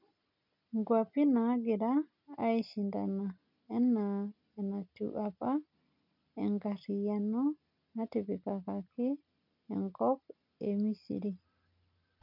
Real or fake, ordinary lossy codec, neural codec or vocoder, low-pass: real; none; none; 5.4 kHz